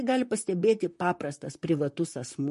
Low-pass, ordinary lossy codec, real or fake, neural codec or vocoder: 14.4 kHz; MP3, 48 kbps; fake; vocoder, 44.1 kHz, 128 mel bands, Pupu-Vocoder